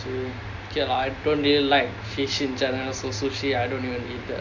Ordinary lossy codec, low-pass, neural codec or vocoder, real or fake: none; 7.2 kHz; none; real